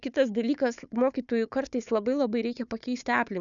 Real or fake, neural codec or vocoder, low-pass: fake; codec, 16 kHz, 4 kbps, FunCodec, trained on Chinese and English, 50 frames a second; 7.2 kHz